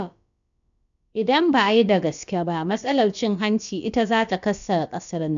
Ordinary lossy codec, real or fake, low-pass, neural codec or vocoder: none; fake; 7.2 kHz; codec, 16 kHz, about 1 kbps, DyCAST, with the encoder's durations